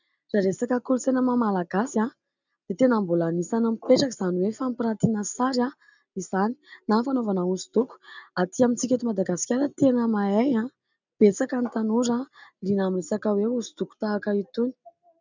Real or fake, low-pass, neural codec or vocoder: real; 7.2 kHz; none